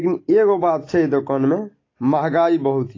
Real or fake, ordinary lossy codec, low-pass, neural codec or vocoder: real; AAC, 32 kbps; 7.2 kHz; none